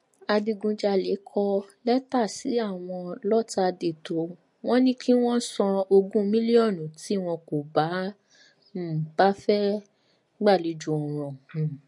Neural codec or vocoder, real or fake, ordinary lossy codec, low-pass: none; real; MP3, 48 kbps; 10.8 kHz